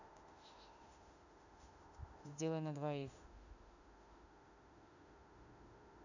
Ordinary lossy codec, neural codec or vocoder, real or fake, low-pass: none; autoencoder, 48 kHz, 32 numbers a frame, DAC-VAE, trained on Japanese speech; fake; 7.2 kHz